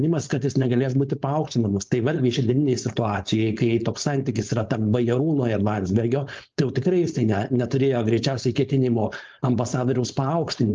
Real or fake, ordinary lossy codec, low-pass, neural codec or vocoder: fake; Opus, 24 kbps; 7.2 kHz; codec, 16 kHz, 4.8 kbps, FACodec